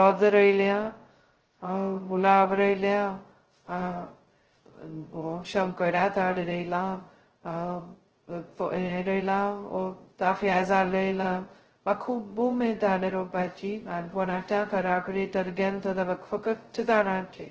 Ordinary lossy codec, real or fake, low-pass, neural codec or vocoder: Opus, 16 kbps; fake; 7.2 kHz; codec, 16 kHz, 0.2 kbps, FocalCodec